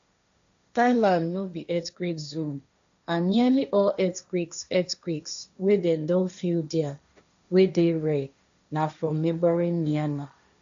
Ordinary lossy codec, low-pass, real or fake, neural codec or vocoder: AAC, 64 kbps; 7.2 kHz; fake; codec, 16 kHz, 1.1 kbps, Voila-Tokenizer